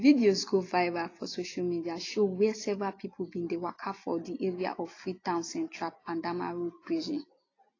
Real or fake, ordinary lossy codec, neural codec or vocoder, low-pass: real; AAC, 32 kbps; none; 7.2 kHz